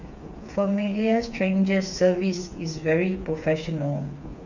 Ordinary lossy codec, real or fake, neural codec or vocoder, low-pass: none; fake; codec, 16 kHz, 4 kbps, FreqCodec, smaller model; 7.2 kHz